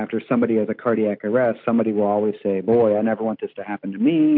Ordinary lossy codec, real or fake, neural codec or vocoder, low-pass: MP3, 48 kbps; real; none; 5.4 kHz